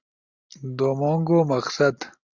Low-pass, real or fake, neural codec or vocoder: 7.2 kHz; real; none